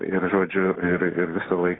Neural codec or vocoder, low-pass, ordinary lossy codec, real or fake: none; 7.2 kHz; AAC, 16 kbps; real